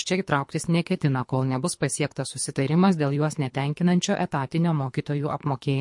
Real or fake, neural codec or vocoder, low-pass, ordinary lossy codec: fake; codec, 24 kHz, 3 kbps, HILCodec; 10.8 kHz; MP3, 48 kbps